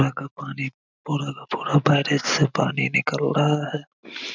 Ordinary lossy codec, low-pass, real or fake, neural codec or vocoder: none; 7.2 kHz; real; none